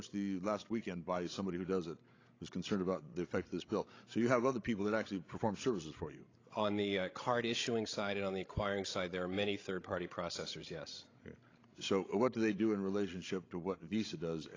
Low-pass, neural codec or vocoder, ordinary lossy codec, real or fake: 7.2 kHz; codec, 16 kHz, 16 kbps, FreqCodec, larger model; AAC, 32 kbps; fake